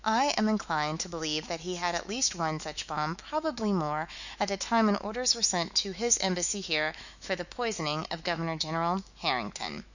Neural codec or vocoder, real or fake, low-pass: autoencoder, 48 kHz, 128 numbers a frame, DAC-VAE, trained on Japanese speech; fake; 7.2 kHz